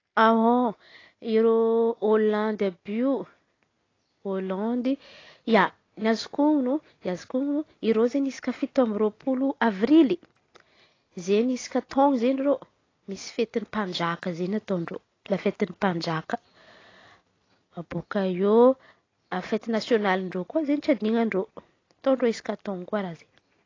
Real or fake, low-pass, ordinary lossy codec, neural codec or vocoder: real; 7.2 kHz; AAC, 32 kbps; none